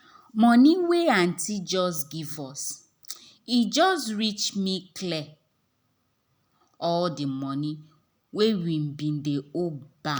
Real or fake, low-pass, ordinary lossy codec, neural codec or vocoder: real; none; none; none